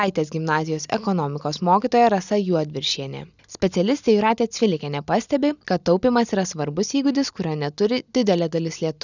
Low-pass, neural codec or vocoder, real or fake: 7.2 kHz; none; real